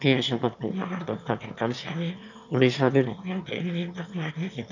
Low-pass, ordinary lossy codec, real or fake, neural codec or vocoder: 7.2 kHz; none; fake; autoencoder, 22.05 kHz, a latent of 192 numbers a frame, VITS, trained on one speaker